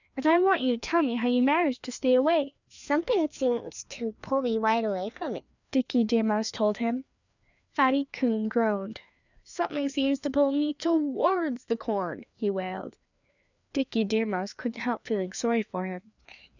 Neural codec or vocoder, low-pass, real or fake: codec, 16 kHz, 2 kbps, FreqCodec, larger model; 7.2 kHz; fake